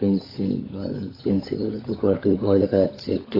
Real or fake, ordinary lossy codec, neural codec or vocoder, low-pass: fake; AAC, 24 kbps; codec, 16 kHz, 16 kbps, FunCodec, trained on LibriTTS, 50 frames a second; 5.4 kHz